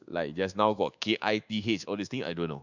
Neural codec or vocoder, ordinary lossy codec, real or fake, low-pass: codec, 24 kHz, 1.2 kbps, DualCodec; none; fake; 7.2 kHz